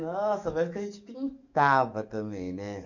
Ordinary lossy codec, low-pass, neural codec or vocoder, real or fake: none; 7.2 kHz; codec, 44.1 kHz, 7.8 kbps, DAC; fake